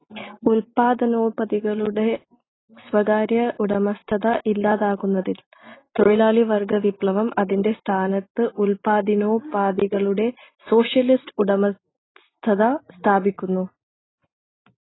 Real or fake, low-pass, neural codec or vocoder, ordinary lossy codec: real; 7.2 kHz; none; AAC, 16 kbps